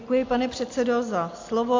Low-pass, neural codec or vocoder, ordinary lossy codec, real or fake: 7.2 kHz; none; MP3, 48 kbps; real